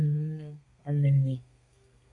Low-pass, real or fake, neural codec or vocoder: 10.8 kHz; fake; codec, 32 kHz, 1.9 kbps, SNAC